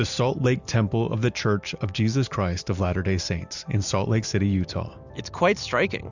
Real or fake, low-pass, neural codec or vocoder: real; 7.2 kHz; none